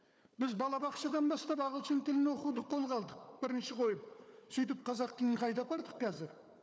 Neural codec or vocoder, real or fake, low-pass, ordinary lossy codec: codec, 16 kHz, 4 kbps, FunCodec, trained on Chinese and English, 50 frames a second; fake; none; none